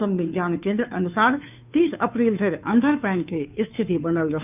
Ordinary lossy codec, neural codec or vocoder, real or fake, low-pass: none; codec, 16 kHz, 2 kbps, FunCodec, trained on Chinese and English, 25 frames a second; fake; 3.6 kHz